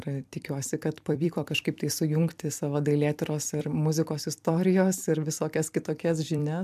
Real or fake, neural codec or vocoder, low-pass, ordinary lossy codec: fake; vocoder, 44.1 kHz, 128 mel bands every 256 samples, BigVGAN v2; 14.4 kHz; MP3, 96 kbps